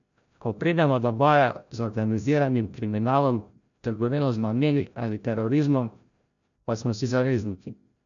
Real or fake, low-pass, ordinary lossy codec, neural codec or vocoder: fake; 7.2 kHz; none; codec, 16 kHz, 0.5 kbps, FreqCodec, larger model